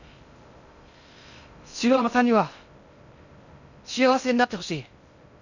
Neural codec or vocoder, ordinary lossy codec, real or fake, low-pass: codec, 16 kHz in and 24 kHz out, 0.6 kbps, FocalCodec, streaming, 2048 codes; none; fake; 7.2 kHz